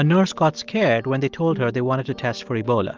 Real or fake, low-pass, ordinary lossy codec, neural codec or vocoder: real; 7.2 kHz; Opus, 32 kbps; none